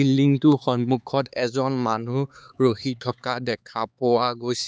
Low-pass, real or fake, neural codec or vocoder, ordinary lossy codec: none; fake; codec, 16 kHz, 4 kbps, X-Codec, HuBERT features, trained on LibriSpeech; none